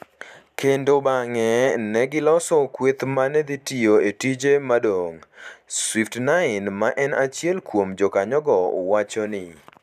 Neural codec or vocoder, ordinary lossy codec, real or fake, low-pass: vocoder, 44.1 kHz, 128 mel bands every 512 samples, BigVGAN v2; none; fake; 14.4 kHz